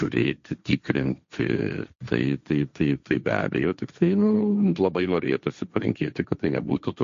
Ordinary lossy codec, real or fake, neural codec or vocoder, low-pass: MP3, 48 kbps; fake; codec, 16 kHz, 1.1 kbps, Voila-Tokenizer; 7.2 kHz